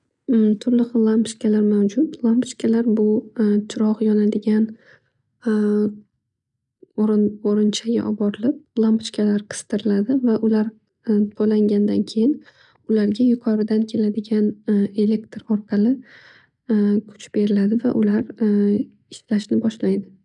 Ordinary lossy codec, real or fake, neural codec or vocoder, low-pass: none; real; none; 10.8 kHz